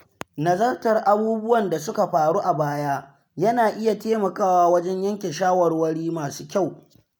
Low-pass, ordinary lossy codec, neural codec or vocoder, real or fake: none; none; none; real